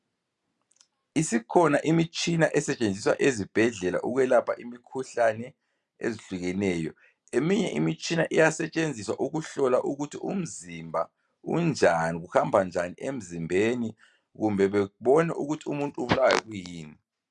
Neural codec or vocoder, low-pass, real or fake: none; 10.8 kHz; real